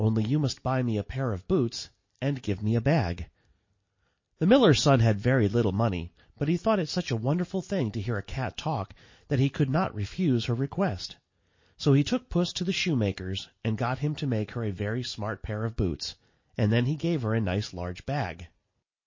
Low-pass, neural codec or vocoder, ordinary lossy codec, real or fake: 7.2 kHz; none; MP3, 32 kbps; real